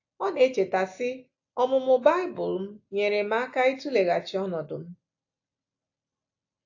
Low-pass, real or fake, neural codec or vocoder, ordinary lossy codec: 7.2 kHz; real; none; AAC, 48 kbps